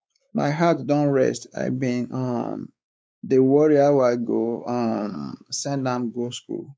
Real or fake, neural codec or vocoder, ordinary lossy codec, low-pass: fake; codec, 16 kHz, 4 kbps, X-Codec, WavLM features, trained on Multilingual LibriSpeech; none; none